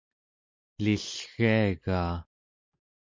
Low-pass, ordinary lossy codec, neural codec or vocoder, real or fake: 7.2 kHz; MP3, 48 kbps; none; real